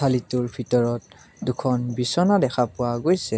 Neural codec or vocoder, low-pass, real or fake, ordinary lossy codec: none; none; real; none